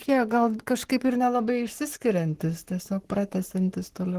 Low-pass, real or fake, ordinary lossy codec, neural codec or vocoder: 14.4 kHz; fake; Opus, 16 kbps; codec, 44.1 kHz, 7.8 kbps, Pupu-Codec